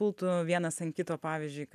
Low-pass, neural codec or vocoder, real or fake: 14.4 kHz; none; real